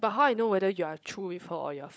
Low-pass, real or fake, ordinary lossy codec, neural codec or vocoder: none; fake; none; codec, 16 kHz, 16 kbps, FunCodec, trained on LibriTTS, 50 frames a second